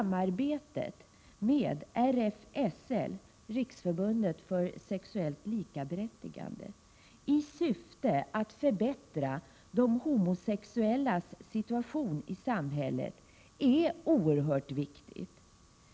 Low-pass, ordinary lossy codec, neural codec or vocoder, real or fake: none; none; none; real